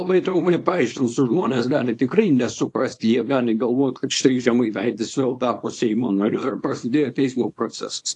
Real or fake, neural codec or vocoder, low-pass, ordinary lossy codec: fake; codec, 24 kHz, 0.9 kbps, WavTokenizer, small release; 10.8 kHz; AAC, 48 kbps